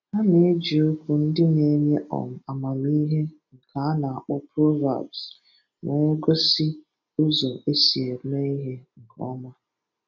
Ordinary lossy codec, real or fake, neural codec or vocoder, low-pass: none; real; none; 7.2 kHz